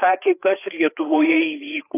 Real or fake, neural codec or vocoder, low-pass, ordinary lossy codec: fake; codec, 16 kHz, 4 kbps, FreqCodec, larger model; 3.6 kHz; AAC, 16 kbps